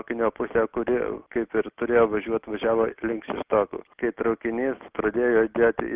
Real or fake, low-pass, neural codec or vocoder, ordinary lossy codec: real; 3.6 kHz; none; Opus, 24 kbps